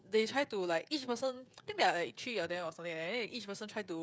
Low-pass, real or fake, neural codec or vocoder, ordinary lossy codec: none; fake; codec, 16 kHz, 16 kbps, FreqCodec, smaller model; none